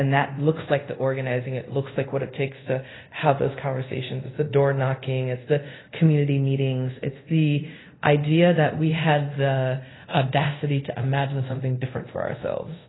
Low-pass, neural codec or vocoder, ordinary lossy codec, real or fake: 7.2 kHz; codec, 24 kHz, 0.5 kbps, DualCodec; AAC, 16 kbps; fake